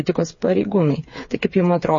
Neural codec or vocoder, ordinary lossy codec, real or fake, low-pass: codec, 16 kHz, 4 kbps, FreqCodec, smaller model; MP3, 32 kbps; fake; 7.2 kHz